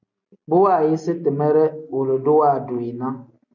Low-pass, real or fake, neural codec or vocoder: 7.2 kHz; real; none